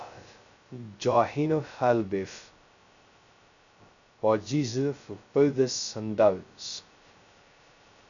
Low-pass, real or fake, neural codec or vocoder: 7.2 kHz; fake; codec, 16 kHz, 0.2 kbps, FocalCodec